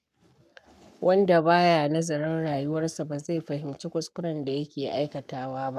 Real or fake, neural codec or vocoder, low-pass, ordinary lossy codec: fake; codec, 44.1 kHz, 7.8 kbps, Pupu-Codec; 14.4 kHz; none